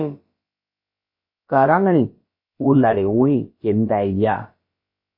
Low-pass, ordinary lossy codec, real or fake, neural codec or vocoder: 5.4 kHz; MP3, 24 kbps; fake; codec, 16 kHz, about 1 kbps, DyCAST, with the encoder's durations